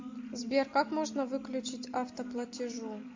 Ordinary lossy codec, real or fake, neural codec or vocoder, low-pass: MP3, 48 kbps; real; none; 7.2 kHz